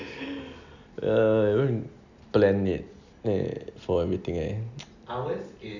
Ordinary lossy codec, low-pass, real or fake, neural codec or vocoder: none; 7.2 kHz; real; none